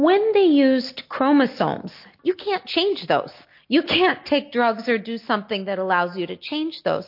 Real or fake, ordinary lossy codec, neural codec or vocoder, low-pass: real; MP3, 32 kbps; none; 5.4 kHz